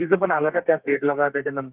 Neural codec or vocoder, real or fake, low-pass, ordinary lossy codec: codec, 32 kHz, 1.9 kbps, SNAC; fake; 3.6 kHz; Opus, 24 kbps